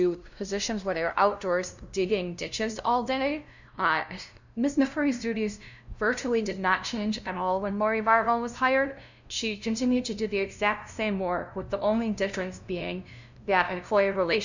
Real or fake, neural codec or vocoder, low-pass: fake; codec, 16 kHz, 0.5 kbps, FunCodec, trained on LibriTTS, 25 frames a second; 7.2 kHz